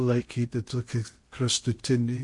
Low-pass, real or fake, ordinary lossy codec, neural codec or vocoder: 10.8 kHz; fake; MP3, 48 kbps; codec, 16 kHz in and 24 kHz out, 0.6 kbps, FocalCodec, streaming, 2048 codes